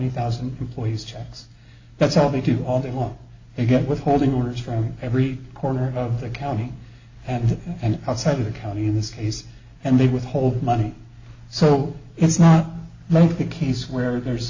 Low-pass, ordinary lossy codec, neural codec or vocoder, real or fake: 7.2 kHz; AAC, 48 kbps; none; real